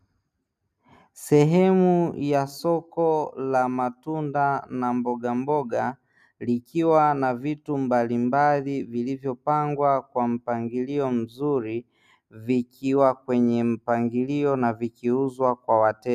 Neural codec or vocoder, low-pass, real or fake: none; 14.4 kHz; real